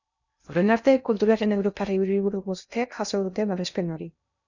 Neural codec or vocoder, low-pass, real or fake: codec, 16 kHz in and 24 kHz out, 0.6 kbps, FocalCodec, streaming, 2048 codes; 7.2 kHz; fake